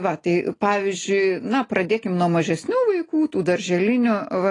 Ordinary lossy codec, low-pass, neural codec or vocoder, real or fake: AAC, 32 kbps; 10.8 kHz; none; real